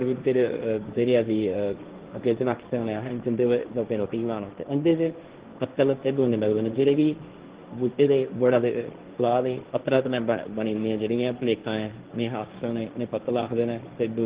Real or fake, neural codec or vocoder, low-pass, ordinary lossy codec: fake; codec, 16 kHz, 1.1 kbps, Voila-Tokenizer; 3.6 kHz; Opus, 16 kbps